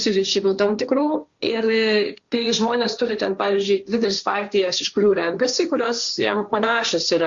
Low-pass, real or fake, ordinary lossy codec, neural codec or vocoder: 7.2 kHz; fake; Opus, 64 kbps; codec, 16 kHz, 1.1 kbps, Voila-Tokenizer